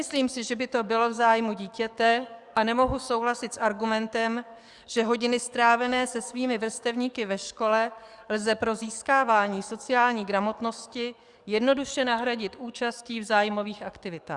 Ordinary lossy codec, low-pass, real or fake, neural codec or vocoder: Opus, 64 kbps; 10.8 kHz; fake; codec, 44.1 kHz, 7.8 kbps, DAC